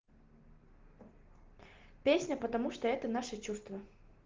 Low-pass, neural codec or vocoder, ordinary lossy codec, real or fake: 7.2 kHz; none; Opus, 16 kbps; real